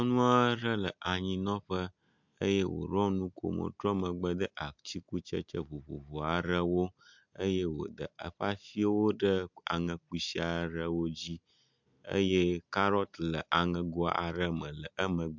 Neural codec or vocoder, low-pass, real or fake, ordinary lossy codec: none; 7.2 kHz; real; MP3, 64 kbps